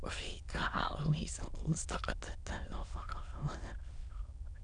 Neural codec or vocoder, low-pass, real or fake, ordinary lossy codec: autoencoder, 22.05 kHz, a latent of 192 numbers a frame, VITS, trained on many speakers; 9.9 kHz; fake; none